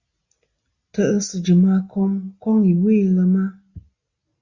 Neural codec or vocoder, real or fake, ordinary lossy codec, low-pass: none; real; Opus, 64 kbps; 7.2 kHz